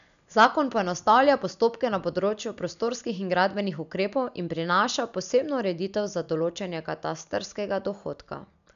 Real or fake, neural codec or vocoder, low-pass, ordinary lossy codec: real; none; 7.2 kHz; none